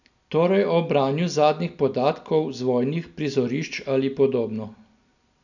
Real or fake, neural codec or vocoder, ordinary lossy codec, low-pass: real; none; none; 7.2 kHz